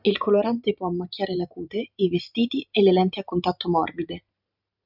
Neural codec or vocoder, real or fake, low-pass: vocoder, 44.1 kHz, 128 mel bands every 512 samples, BigVGAN v2; fake; 5.4 kHz